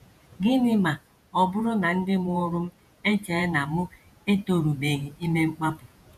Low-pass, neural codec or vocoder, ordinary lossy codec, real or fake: 14.4 kHz; vocoder, 48 kHz, 128 mel bands, Vocos; none; fake